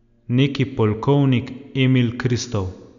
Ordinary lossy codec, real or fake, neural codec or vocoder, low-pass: none; real; none; 7.2 kHz